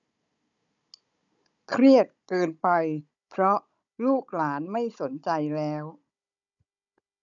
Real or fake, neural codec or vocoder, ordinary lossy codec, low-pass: fake; codec, 16 kHz, 16 kbps, FunCodec, trained on Chinese and English, 50 frames a second; none; 7.2 kHz